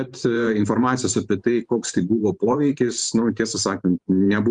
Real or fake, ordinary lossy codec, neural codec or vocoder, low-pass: fake; Opus, 32 kbps; vocoder, 44.1 kHz, 128 mel bands every 512 samples, BigVGAN v2; 10.8 kHz